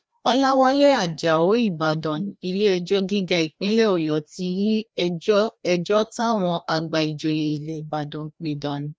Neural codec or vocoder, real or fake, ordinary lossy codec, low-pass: codec, 16 kHz, 1 kbps, FreqCodec, larger model; fake; none; none